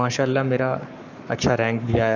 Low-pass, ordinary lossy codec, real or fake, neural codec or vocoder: 7.2 kHz; none; fake; codec, 44.1 kHz, 7.8 kbps, Pupu-Codec